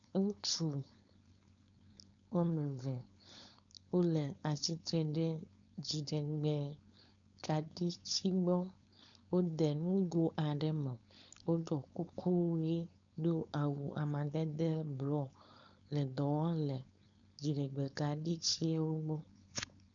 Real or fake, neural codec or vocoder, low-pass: fake; codec, 16 kHz, 4.8 kbps, FACodec; 7.2 kHz